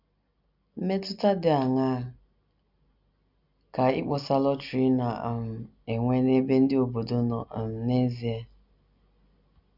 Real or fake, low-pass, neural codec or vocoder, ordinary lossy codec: real; 5.4 kHz; none; none